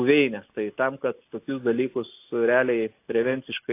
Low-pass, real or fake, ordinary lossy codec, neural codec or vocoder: 3.6 kHz; real; AAC, 24 kbps; none